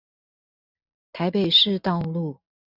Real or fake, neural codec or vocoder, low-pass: real; none; 5.4 kHz